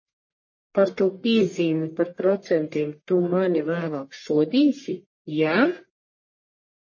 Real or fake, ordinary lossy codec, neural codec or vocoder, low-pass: fake; MP3, 32 kbps; codec, 44.1 kHz, 1.7 kbps, Pupu-Codec; 7.2 kHz